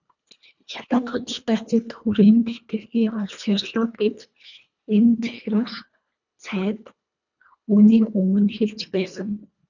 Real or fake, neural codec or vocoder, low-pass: fake; codec, 24 kHz, 1.5 kbps, HILCodec; 7.2 kHz